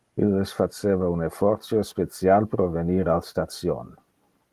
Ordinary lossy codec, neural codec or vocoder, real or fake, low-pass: Opus, 24 kbps; none; real; 14.4 kHz